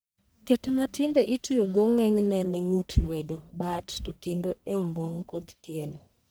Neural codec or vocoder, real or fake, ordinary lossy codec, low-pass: codec, 44.1 kHz, 1.7 kbps, Pupu-Codec; fake; none; none